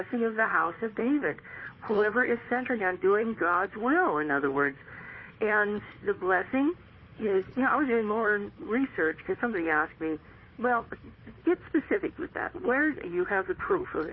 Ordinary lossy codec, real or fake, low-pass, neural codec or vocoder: MP3, 24 kbps; fake; 7.2 kHz; codec, 16 kHz, 4 kbps, FunCodec, trained on Chinese and English, 50 frames a second